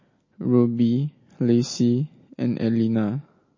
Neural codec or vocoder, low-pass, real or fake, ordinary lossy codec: none; 7.2 kHz; real; MP3, 32 kbps